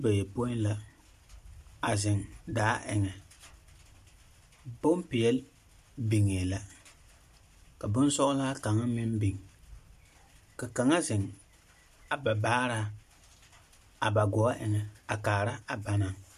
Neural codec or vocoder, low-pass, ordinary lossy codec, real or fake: none; 14.4 kHz; MP3, 64 kbps; real